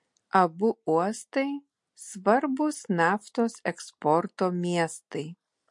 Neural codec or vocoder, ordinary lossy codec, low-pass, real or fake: none; MP3, 48 kbps; 10.8 kHz; real